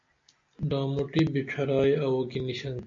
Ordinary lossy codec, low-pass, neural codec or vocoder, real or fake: MP3, 48 kbps; 7.2 kHz; none; real